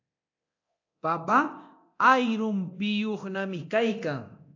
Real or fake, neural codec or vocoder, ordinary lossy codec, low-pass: fake; codec, 24 kHz, 0.9 kbps, DualCodec; AAC, 48 kbps; 7.2 kHz